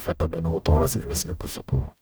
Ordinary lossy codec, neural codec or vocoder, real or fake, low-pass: none; codec, 44.1 kHz, 0.9 kbps, DAC; fake; none